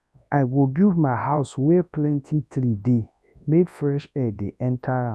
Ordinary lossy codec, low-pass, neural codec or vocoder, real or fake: none; none; codec, 24 kHz, 0.9 kbps, WavTokenizer, large speech release; fake